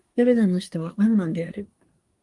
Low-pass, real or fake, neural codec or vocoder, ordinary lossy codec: 10.8 kHz; fake; codec, 24 kHz, 1 kbps, SNAC; Opus, 32 kbps